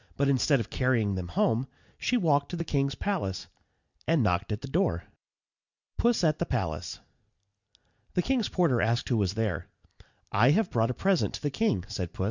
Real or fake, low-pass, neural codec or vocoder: real; 7.2 kHz; none